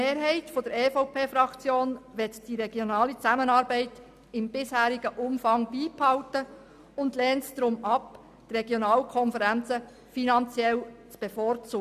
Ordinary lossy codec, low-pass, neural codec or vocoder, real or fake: none; 14.4 kHz; none; real